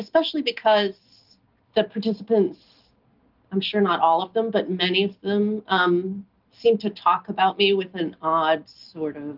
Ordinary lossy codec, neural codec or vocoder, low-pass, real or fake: Opus, 32 kbps; none; 5.4 kHz; real